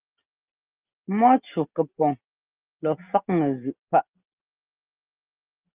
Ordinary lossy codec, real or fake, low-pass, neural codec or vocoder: Opus, 32 kbps; real; 3.6 kHz; none